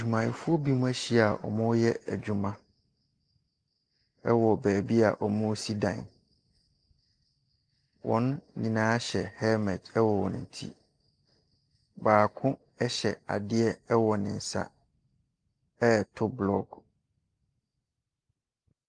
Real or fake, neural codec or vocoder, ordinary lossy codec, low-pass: real; none; Opus, 32 kbps; 9.9 kHz